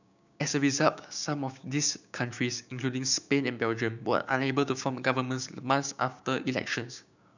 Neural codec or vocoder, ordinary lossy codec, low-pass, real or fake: codec, 16 kHz, 6 kbps, DAC; none; 7.2 kHz; fake